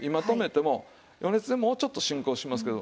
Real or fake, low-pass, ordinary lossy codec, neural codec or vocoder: real; none; none; none